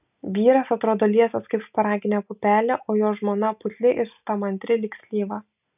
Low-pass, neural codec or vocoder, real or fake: 3.6 kHz; none; real